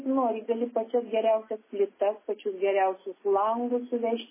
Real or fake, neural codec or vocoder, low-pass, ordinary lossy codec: real; none; 3.6 kHz; MP3, 16 kbps